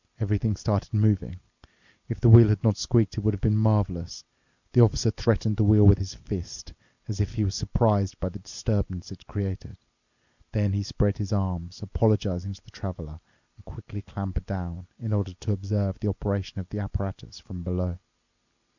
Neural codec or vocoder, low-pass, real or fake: none; 7.2 kHz; real